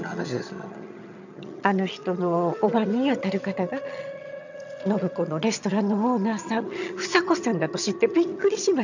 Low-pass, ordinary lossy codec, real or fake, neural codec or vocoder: 7.2 kHz; none; fake; vocoder, 22.05 kHz, 80 mel bands, HiFi-GAN